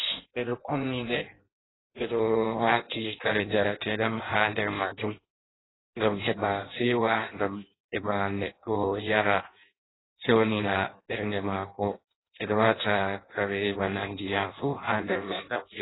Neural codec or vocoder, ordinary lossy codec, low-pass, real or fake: codec, 16 kHz in and 24 kHz out, 0.6 kbps, FireRedTTS-2 codec; AAC, 16 kbps; 7.2 kHz; fake